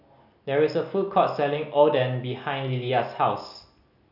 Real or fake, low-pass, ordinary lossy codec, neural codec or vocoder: real; 5.4 kHz; none; none